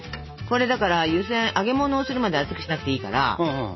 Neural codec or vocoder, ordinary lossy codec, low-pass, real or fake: none; MP3, 24 kbps; 7.2 kHz; real